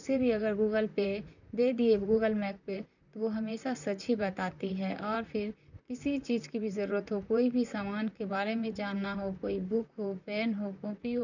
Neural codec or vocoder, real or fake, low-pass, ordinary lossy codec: vocoder, 44.1 kHz, 128 mel bands, Pupu-Vocoder; fake; 7.2 kHz; none